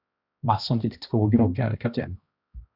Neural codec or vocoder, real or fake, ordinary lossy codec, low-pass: codec, 16 kHz, 1 kbps, X-Codec, HuBERT features, trained on balanced general audio; fake; Opus, 64 kbps; 5.4 kHz